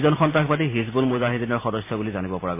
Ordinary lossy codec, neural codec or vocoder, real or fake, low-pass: MP3, 24 kbps; none; real; 3.6 kHz